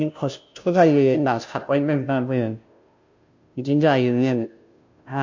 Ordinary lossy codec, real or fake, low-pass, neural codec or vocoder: MP3, 48 kbps; fake; 7.2 kHz; codec, 16 kHz, 0.5 kbps, FunCodec, trained on Chinese and English, 25 frames a second